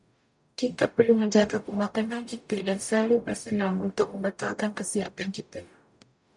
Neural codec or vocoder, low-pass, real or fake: codec, 44.1 kHz, 0.9 kbps, DAC; 10.8 kHz; fake